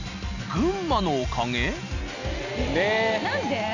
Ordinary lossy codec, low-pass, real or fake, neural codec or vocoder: none; 7.2 kHz; real; none